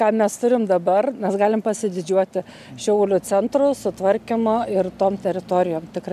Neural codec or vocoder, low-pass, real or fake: none; 14.4 kHz; real